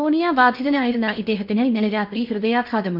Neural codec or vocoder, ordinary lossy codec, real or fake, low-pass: codec, 16 kHz in and 24 kHz out, 0.8 kbps, FocalCodec, streaming, 65536 codes; none; fake; 5.4 kHz